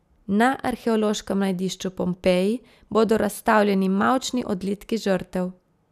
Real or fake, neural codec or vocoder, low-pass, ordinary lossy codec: real; none; 14.4 kHz; none